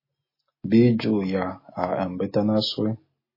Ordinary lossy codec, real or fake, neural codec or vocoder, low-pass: MP3, 24 kbps; real; none; 5.4 kHz